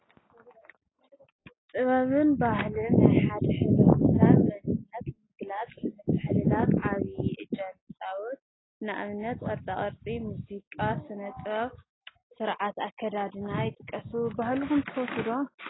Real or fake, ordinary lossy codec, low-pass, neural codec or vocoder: real; AAC, 16 kbps; 7.2 kHz; none